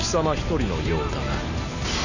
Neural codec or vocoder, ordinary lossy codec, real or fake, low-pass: none; none; real; 7.2 kHz